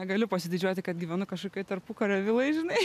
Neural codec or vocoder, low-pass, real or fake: none; 14.4 kHz; real